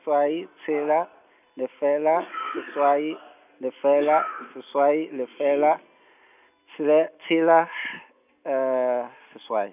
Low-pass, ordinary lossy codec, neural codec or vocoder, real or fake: 3.6 kHz; none; none; real